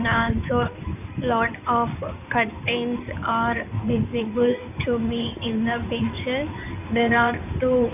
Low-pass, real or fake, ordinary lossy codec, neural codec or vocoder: 3.6 kHz; fake; none; codec, 16 kHz in and 24 kHz out, 2.2 kbps, FireRedTTS-2 codec